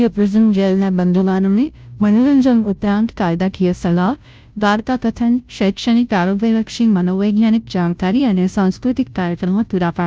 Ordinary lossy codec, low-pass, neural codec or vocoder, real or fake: none; none; codec, 16 kHz, 0.5 kbps, FunCodec, trained on Chinese and English, 25 frames a second; fake